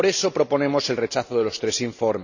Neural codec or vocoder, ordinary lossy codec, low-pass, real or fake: none; none; 7.2 kHz; real